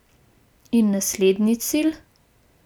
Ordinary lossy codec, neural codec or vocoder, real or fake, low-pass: none; none; real; none